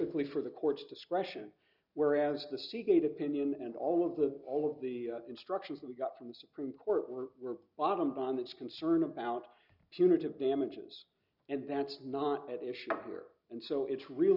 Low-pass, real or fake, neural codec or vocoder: 5.4 kHz; real; none